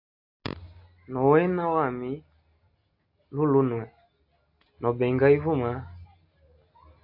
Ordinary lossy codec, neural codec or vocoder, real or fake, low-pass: AAC, 48 kbps; none; real; 5.4 kHz